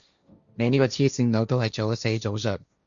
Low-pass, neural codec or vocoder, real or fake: 7.2 kHz; codec, 16 kHz, 1.1 kbps, Voila-Tokenizer; fake